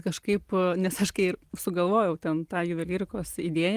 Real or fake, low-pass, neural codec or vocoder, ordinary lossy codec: real; 14.4 kHz; none; Opus, 24 kbps